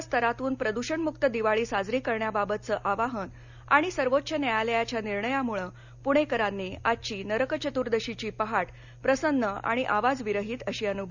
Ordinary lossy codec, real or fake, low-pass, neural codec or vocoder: none; real; 7.2 kHz; none